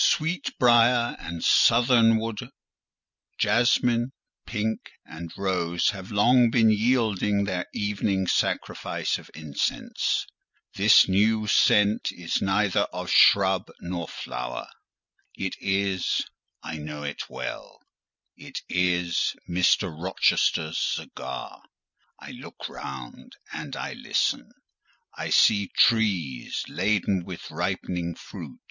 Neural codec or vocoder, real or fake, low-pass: none; real; 7.2 kHz